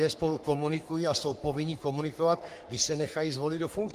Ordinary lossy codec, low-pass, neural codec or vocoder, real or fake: Opus, 32 kbps; 14.4 kHz; codec, 44.1 kHz, 3.4 kbps, Pupu-Codec; fake